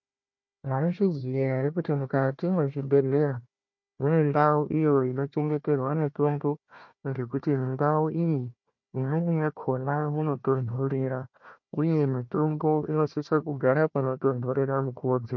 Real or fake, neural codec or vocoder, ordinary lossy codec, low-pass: fake; codec, 16 kHz, 1 kbps, FunCodec, trained on Chinese and English, 50 frames a second; MP3, 48 kbps; 7.2 kHz